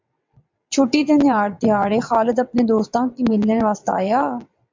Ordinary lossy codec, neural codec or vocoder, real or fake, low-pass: MP3, 64 kbps; vocoder, 22.05 kHz, 80 mel bands, WaveNeXt; fake; 7.2 kHz